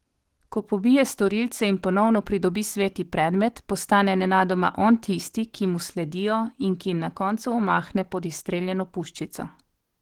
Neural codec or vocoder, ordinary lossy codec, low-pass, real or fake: codec, 44.1 kHz, 7.8 kbps, DAC; Opus, 16 kbps; 19.8 kHz; fake